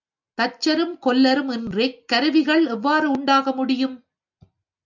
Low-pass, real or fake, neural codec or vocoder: 7.2 kHz; real; none